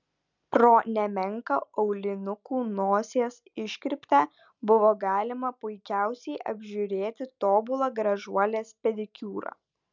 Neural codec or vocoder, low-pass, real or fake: none; 7.2 kHz; real